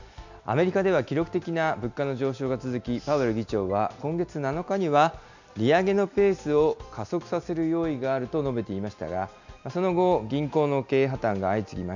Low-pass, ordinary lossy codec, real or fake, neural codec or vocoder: 7.2 kHz; none; real; none